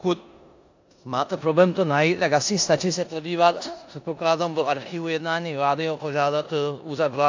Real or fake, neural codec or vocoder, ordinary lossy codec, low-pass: fake; codec, 16 kHz in and 24 kHz out, 0.9 kbps, LongCat-Audio-Codec, four codebook decoder; AAC, 48 kbps; 7.2 kHz